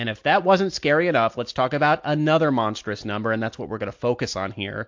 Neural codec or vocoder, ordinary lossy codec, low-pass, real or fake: none; MP3, 48 kbps; 7.2 kHz; real